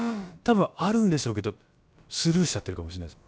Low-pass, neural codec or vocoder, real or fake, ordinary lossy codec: none; codec, 16 kHz, about 1 kbps, DyCAST, with the encoder's durations; fake; none